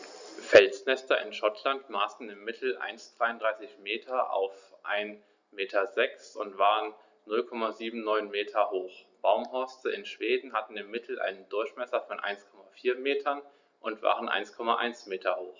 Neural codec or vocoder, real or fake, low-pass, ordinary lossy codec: none; real; 7.2 kHz; Opus, 64 kbps